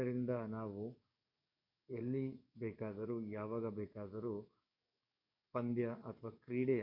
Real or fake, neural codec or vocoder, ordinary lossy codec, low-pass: fake; codec, 44.1 kHz, 7.8 kbps, DAC; none; 5.4 kHz